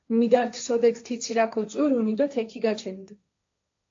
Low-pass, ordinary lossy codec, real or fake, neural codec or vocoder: 7.2 kHz; AAC, 48 kbps; fake; codec, 16 kHz, 1.1 kbps, Voila-Tokenizer